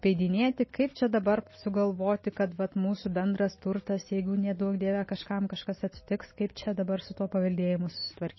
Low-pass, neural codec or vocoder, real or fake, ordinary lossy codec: 7.2 kHz; none; real; MP3, 24 kbps